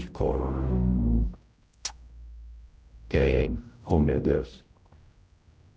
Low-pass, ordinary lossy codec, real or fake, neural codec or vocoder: none; none; fake; codec, 16 kHz, 0.5 kbps, X-Codec, HuBERT features, trained on general audio